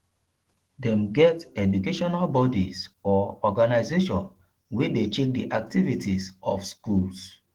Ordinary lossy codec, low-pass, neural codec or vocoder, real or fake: Opus, 16 kbps; 19.8 kHz; autoencoder, 48 kHz, 128 numbers a frame, DAC-VAE, trained on Japanese speech; fake